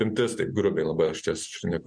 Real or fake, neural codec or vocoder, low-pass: real; none; 9.9 kHz